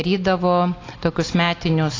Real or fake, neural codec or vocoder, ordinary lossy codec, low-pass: real; none; AAC, 32 kbps; 7.2 kHz